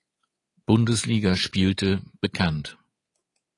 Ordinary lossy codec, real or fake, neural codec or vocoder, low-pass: AAC, 32 kbps; fake; codec, 24 kHz, 3.1 kbps, DualCodec; 10.8 kHz